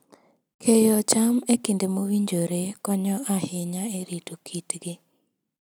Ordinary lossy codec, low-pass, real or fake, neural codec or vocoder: none; none; real; none